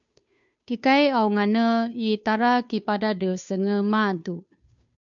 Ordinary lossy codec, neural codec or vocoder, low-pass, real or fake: MP3, 64 kbps; codec, 16 kHz, 2 kbps, FunCodec, trained on Chinese and English, 25 frames a second; 7.2 kHz; fake